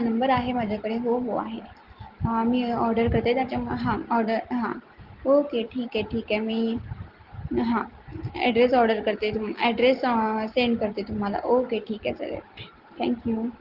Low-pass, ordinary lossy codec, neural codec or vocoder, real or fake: 5.4 kHz; Opus, 16 kbps; none; real